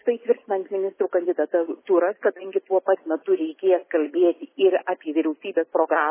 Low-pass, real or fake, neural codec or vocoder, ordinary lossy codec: 3.6 kHz; real; none; MP3, 16 kbps